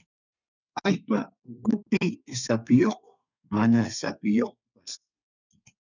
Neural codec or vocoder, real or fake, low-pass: codec, 32 kHz, 1.9 kbps, SNAC; fake; 7.2 kHz